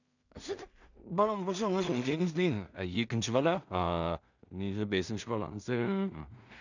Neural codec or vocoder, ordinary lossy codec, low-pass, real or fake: codec, 16 kHz in and 24 kHz out, 0.4 kbps, LongCat-Audio-Codec, two codebook decoder; none; 7.2 kHz; fake